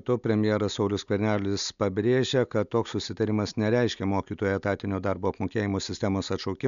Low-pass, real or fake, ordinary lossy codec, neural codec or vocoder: 7.2 kHz; real; MP3, 96 kbps; none